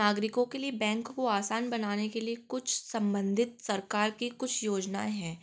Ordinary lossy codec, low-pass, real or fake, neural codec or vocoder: none; none; real; none